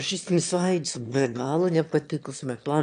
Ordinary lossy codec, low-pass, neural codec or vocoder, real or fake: AAC, 96 kbps; 9.9 kHz; autoencoder, 22.05 kHz, a latent of 192 numbers a frame, VITS, trained on one speaker; fake